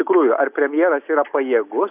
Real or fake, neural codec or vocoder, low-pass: real; none; 3.6 kHz